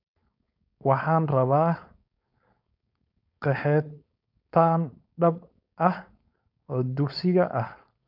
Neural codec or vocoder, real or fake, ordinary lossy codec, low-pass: codec, 16 kHz, 4.8 kbps, FACodec; fake; none; 5.4 kHz